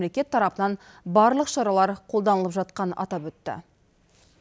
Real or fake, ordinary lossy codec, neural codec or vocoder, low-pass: real; none; none; none